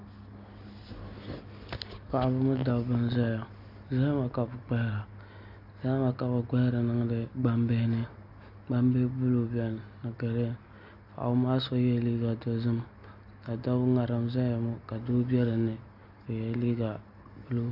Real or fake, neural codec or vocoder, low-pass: real; none; 5.4 kHz